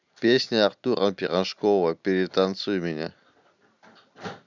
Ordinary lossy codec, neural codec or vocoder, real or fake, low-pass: none; none; real; 7.2 kHz